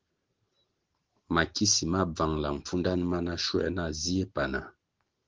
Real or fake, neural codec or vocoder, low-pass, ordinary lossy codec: real; none; 7.2 kHz; Opus, 16 kbps